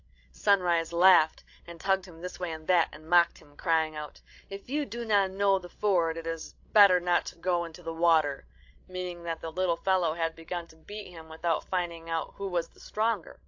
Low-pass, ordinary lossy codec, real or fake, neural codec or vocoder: 7.2 kHz; AAC, 48 kbps; fake; codec, 16 kHz, 16 kbps, FreqCodec, larger model